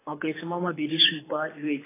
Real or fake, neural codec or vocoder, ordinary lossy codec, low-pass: fake; codec, 24 kHz, 6 kbps, HILCodec; AAC, 16 kbps; 3.6 kHz